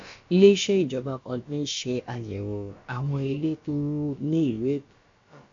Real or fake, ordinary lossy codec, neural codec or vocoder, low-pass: fake; MP3, 48 kbps; codec, 16 kHz, about 1 kbps, DyCAST, with the encoder's durations; 7.2 kHz